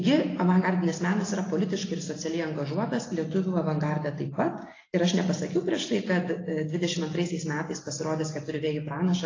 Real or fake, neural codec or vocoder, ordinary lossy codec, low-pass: real; none; AAC, 32 kbps; 7.2 kHz